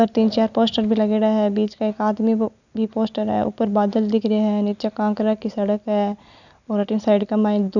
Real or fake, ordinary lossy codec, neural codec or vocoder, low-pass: real; none; none; 7.2 kHz